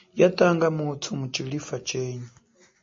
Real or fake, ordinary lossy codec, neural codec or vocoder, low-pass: real; MP3, 32 kbps; none; 7.2 kHz